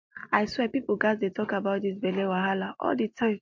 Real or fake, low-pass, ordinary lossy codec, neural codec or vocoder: real; 7.2 kHz; MP3, 48 kbps; none